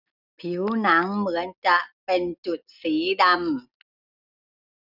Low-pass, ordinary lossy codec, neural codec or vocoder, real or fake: 5.4 kHz; none; none; real